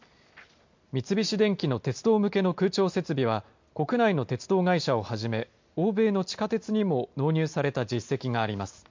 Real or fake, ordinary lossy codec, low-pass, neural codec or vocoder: real; MP3, 48 kbps; 7.2 kHz; none